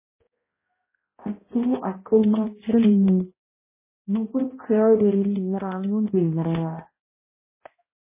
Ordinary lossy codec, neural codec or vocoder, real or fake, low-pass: MP3, 16 kbps; codec, 16 kHz, 0.5 kbps, X-Codec, HuBERT features, trained on balanced general audio; fake; 3.6 kHz